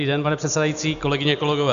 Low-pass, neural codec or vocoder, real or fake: 7.2 kHz; none; real